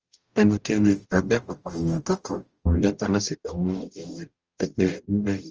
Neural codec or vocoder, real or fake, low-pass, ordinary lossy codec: codec, 44.1 kHz, 0.9 kbps, DAC; fake; 7.2 kHz; Opus, 24 kbps